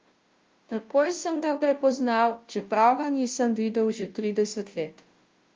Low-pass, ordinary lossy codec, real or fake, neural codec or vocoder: 7.2 kHz; Opus, 32 kbps; fake; codec, 16 kHz, 0.5 kbps, FunCodec, trained on Chinese and English, 25 frames a second